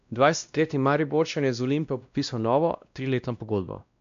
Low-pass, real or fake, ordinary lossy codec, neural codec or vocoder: 7.2 kHz; fake; MP3, 64 kbps; codec, 16 kHz, 1 kbps, X-Codec, WavLM features, trained on Multilingual LibriSpeech